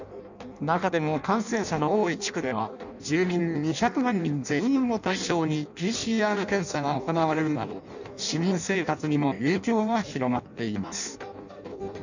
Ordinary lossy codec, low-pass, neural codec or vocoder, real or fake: none; 7.2 kHz; codec, 16 kHz in and 24 kHz out, 0.6 kbps, FireRedTTS-2 codec; fake